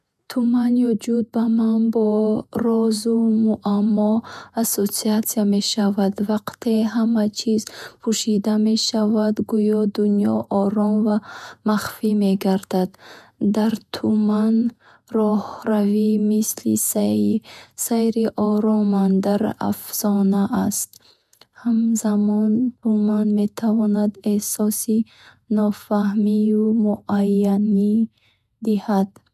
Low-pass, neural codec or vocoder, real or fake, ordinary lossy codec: 14.4 kHz; vocoder, 48 kHz, 128 mel bands, Vocos; fake; none